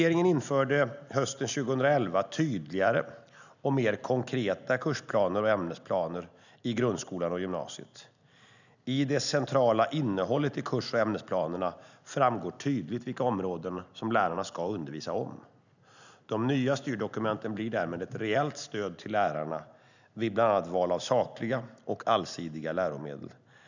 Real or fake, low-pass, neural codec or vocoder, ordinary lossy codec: real; 7.2 kHz; none; none